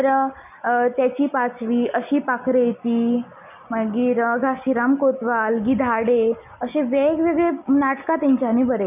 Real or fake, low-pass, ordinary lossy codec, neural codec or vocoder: real; 3.6 kHz; none; none